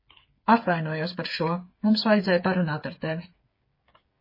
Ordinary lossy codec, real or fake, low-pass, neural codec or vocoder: MP3, 24 kbps; fake; 5.4 kHz; codec, 16 kHz, 8 kbps, FreqCodec, smaller model